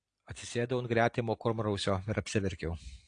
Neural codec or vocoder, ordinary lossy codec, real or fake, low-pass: none; AAC, 48 kbps; real; 9.9 kHz